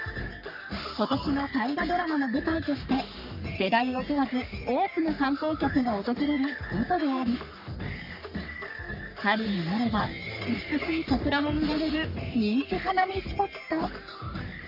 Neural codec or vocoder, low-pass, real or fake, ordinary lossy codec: codec, 44.1 kHz, 3.4 kbps, Pupu-Codec; 5.4 kHz; fake; none